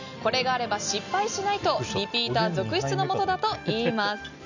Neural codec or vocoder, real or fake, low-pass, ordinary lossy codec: none; real; 7.2 kHz; none